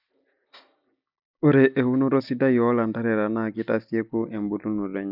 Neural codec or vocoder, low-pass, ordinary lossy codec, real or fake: none; 5.4 kHz; none; real